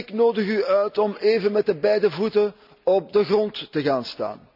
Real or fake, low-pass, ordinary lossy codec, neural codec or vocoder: real; 5.4 kHz; none; none